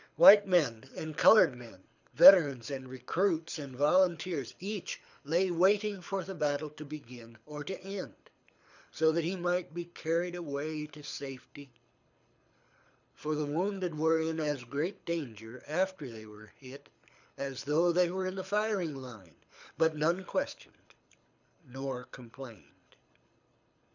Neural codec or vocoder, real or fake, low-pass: codec, 24 kHz, 6 kbps, HILCodec; fake; 7.2 kHz